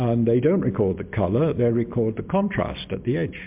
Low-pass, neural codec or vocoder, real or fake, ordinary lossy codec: 3.6 kHz; none; real; MP3, 32 kbps